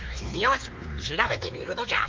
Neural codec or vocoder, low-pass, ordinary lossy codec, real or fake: codec, 16 kHz, 2 kbps, X-Codec, WavLM features, trained on Multilingual LibriSpeech; 7.2 kHz; Opus, 24 kbps; fake